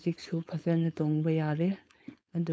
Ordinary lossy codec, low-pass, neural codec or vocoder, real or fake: none; none; codec, 16 kHz, 4.8 kbps, FACodec; fake